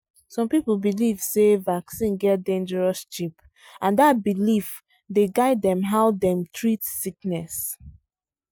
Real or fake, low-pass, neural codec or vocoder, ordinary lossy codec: real; none; none; none